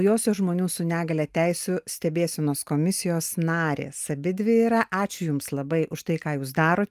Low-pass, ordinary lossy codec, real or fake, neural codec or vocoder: 14.4 kHz; Opus, 32 kbps; real; none